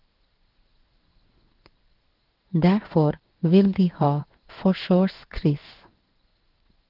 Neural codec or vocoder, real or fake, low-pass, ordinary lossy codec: codec, 16 kHz in and 24 kHz out, 1 kbps, XY-Tokenizer; fake; 5.4 kHz; Opus, 16 kbps